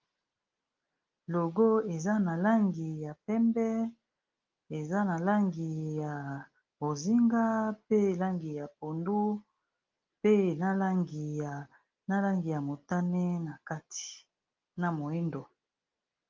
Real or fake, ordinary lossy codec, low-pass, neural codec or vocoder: real; Opus, 32 kbps; 7.2 kHz; none